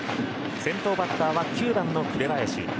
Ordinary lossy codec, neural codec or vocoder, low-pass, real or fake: none; none; none; real